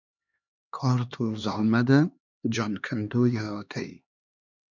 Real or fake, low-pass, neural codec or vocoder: fake; 7.2 kHz; codec, 16 kHz, 2 kbps, X-Codec, HuBERT features, trained on LibriSpeech